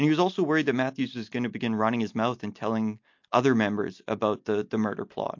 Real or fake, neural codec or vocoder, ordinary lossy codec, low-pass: real; none; MP3, 48 kbps; 7.2 kHz